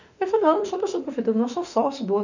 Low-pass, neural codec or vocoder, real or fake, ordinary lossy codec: 7.2 kHz; autoencoder, 48 kHz, 32 numbers a frame, DAC-VAE, trained on Japanese speech; fake; none